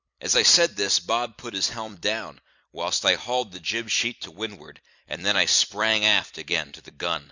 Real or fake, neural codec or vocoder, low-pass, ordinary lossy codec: fake; vocoder, 44.1 kHz, 128 mel bands every 256 samples, BigVGAN v2; 7.2 kHz; Opus, 64 kbps